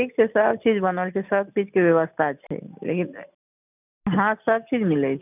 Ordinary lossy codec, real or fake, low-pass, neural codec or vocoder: none; real; 3.6 kHz; none